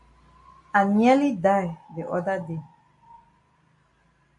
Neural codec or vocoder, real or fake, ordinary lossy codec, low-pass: none; real; AAC, 64 kbps; 10.8 kHz